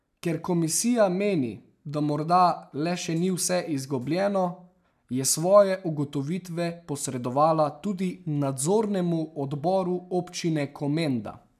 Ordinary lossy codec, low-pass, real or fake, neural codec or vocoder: none; 14.4 kHz; real; none